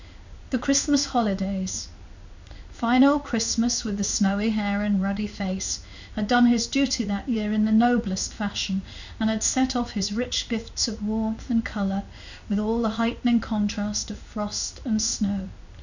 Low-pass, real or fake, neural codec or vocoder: 7.2 kHz; fake; codec, 16 kHz in and 24 kHz out, 1 kbps, XY-Tokenizer